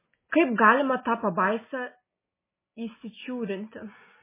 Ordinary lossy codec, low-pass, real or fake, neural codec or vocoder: MP3, 16 kbps; 3.6 kHz; real; none